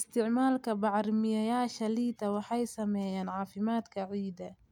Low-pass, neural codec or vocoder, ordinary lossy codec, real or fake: 19.8 kHz; none; none; real